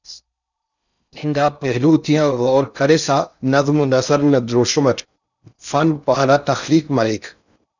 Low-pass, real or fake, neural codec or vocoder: 7.2 kHz; fake; codec, 16 kHz in and 24 kHz out, 0.6 kbps, FocalCodec, streaming, 2048 codes